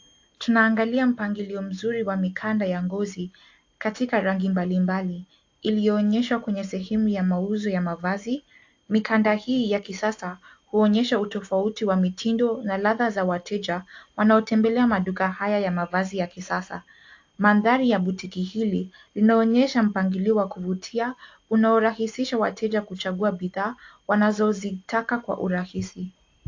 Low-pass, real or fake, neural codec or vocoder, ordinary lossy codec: 7.2 kHz; real; none; AAC, 48 kbps